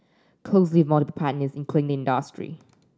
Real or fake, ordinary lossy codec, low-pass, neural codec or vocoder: real; none; none; none